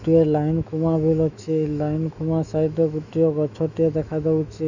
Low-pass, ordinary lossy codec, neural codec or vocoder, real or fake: 7.2 kHz; none; autoencoder, 48 kHz, 128 numbers a frame, DAC-VAE, trained on Japanese speech; fake